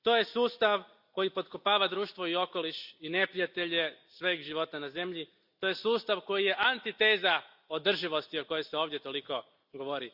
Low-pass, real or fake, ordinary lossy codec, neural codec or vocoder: 5.4 kHz; real; Opus, 64 kbps; none